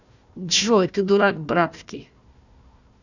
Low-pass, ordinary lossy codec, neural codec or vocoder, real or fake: 7.2 kHz; none; codec, 16 kHz, 1 kbps, FunCodec, trained on Chinese and English, 50 frames a second; fake